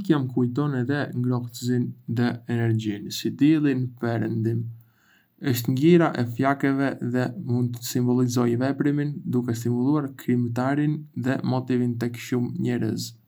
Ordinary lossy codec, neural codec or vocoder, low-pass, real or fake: none; none; none; real